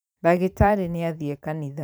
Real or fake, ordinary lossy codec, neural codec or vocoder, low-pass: fake; none; vocoder, 44.1 kHz, 128 mel bands every 512 samples, BigVGAN v2; none